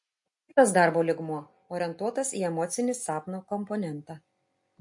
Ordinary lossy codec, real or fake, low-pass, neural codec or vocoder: MP3, 48 kbps; real; 10.8 kHz; none